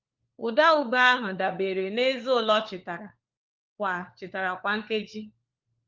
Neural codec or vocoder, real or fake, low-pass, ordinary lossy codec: codec, 16 kHz, 4 kbps, FunCodec, trained on LibriTTS, 50 frames a second; fake; 7.2 kHz; Opus, 24 kbps